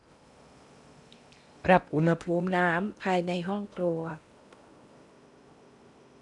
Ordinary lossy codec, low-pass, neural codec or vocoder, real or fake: none; 10.8 kHz; codec, 16 kHz in and 24 kHz out, 0.8 kbps, FocalCodec, streaming, 65536 codes; fake